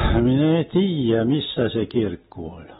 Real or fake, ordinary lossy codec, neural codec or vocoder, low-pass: real; AAC, 16 kbps; none; 19.8 kHz